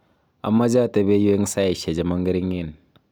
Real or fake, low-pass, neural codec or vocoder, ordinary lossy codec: real; none; none; none